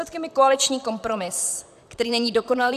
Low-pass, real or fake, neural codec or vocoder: 14.4 kHz; fake; vocoder, 44.1 kHz, 128 mel bands, Pupu-Vocoder